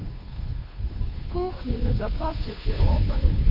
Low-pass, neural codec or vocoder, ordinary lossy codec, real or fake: 5.4 kHz; codec, 16 kHz, 2 kbps, FunCodec, trained on Chinese and English, 25 frames a second; none; fake